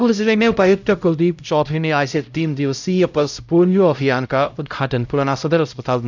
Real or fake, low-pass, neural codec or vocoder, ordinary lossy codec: fake; 7.2 kHz; codec, 16 kHz, 0.5 kbps, X-Codec, HuBERT features, trained on LibriSpeech; none